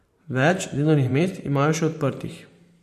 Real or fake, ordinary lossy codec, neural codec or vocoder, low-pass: fake; MP3, 64 kbps; vocoder, 44.1 kHz, 128 mel bands every 512 samples, BigVGAN v2; 14.4 kHz